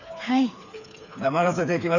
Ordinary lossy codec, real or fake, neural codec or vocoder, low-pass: none; fake; codec, 16 kHz, 4 kbps, FreqCodec, smaller model; 7.2 kHz